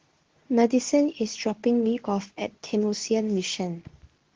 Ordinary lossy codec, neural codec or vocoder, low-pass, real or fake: Opus, 16 kbps; codec, 24 kHz, 0.9 kbps, WavTokenizer, medium speech release version 2; 7.2 kHz; fake